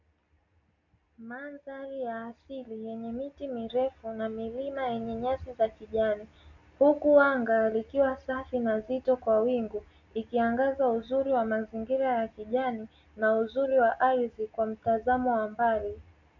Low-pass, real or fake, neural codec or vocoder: 7.2 kHz; real; none